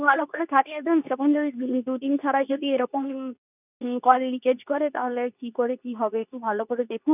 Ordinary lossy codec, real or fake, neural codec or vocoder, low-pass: none; fake; codec, 24 kHz, 0.9 kbps, WavTokenizer, medium speech release version 2; 3.6 kHz